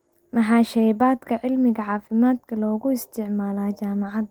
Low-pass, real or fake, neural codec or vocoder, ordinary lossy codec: 19.8 kHz; real; none; Opus, 24 kbps